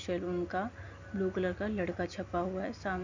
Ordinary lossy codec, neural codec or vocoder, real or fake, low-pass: AAC, 48 kbps; none; real; 7.2 kHz